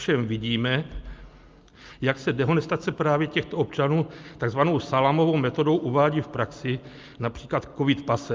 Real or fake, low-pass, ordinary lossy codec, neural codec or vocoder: real; 7.2 kHz; Opus, 24 kbps; none